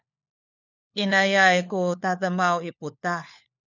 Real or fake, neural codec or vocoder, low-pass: fake; codec, 16 kHz, 4 kbps, FunCodec, trained on LibriTTS, 50 frames a second; 7.2 kHz